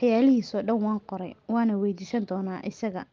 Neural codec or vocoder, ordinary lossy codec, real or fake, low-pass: none; Opus, 32 kbps; real; 7.2 kHz